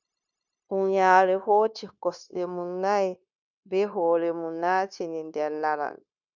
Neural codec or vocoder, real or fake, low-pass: codec, 16 kHz, 0.9 kbps, LongCat-Audio-Codec; fake; 7.2 kHz